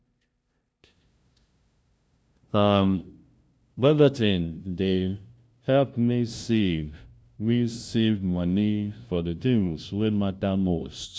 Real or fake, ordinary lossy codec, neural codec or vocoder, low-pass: fake; none; codec, 16 kHz, 0.5 kbps, FunCodec, trained on LibriTTS, 25 frames a second; none